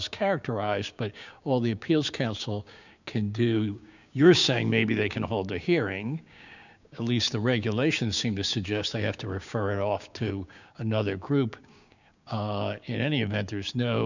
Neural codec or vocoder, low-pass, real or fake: codec, 16 kHz, 6 kbps, DAC; 7.2 kHz; fake